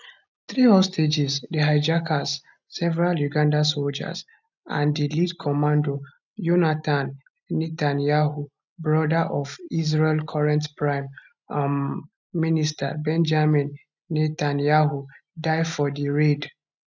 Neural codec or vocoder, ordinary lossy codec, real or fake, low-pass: none; none; real; 7.2 kHz